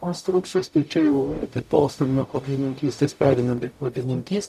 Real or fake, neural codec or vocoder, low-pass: fake; codec, 44.1 kHz, 0.9 kbps, DAC; 14.4 kHz